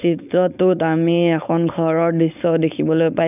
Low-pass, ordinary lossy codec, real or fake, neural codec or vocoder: 3.6 kHz; none; fake; codec, 16 kHz, 4.8 kbps, FACodec